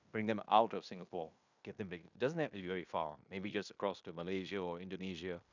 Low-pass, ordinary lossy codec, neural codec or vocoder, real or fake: 7.2 kHz; none; codec, 16 kHz in and 24 kHz out, 0.9 kbps, LongCat-Audio-Codec, fine tuned four codebook decoder; fake